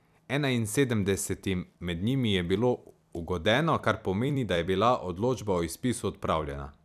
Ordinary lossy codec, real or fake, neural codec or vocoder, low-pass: none; fake; vocoder, 44.1 kHz, 128 mel bands every 256 samples, BigVGAN v2; 14.4 kHz